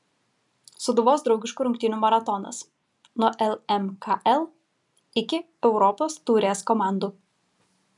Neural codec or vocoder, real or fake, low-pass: none; real; 10.8 kHz